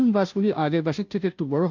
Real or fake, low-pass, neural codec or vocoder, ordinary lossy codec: fake; 7.2 kHz; codec, 16 kHz, 0.5 kbps, FunCodec, trained on Chinese and English, 25 frames a second; none